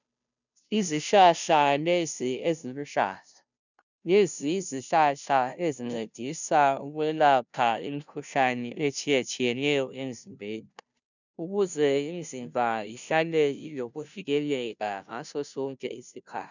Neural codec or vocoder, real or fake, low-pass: codec, 16 kHz, 0.5 kbps, FunCodec, trained on Chinese and English, 25 frames a second; fake; 7.2 kHz